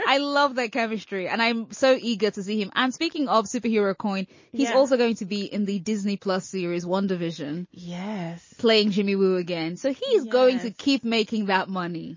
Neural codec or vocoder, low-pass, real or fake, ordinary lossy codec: none; 7.2 kHz; real; MP3, 32 kbps